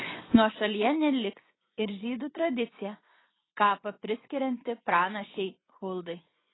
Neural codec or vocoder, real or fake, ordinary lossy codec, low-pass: vocoder, 24 kHz, 100 mel bands, Vocos; fake; AAC, 16 kbps; 7.2 kHz